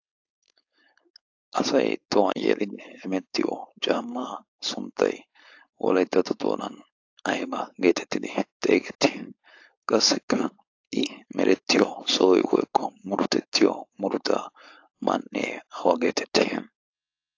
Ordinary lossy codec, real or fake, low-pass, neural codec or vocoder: AAC, 48 kbps; fake; 7.2 kHz; codec, 16 kHz, 4.8 kbps, FACodec